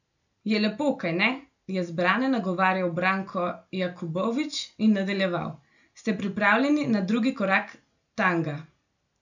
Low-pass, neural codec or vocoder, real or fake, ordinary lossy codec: 7.2 kHz; none; real; none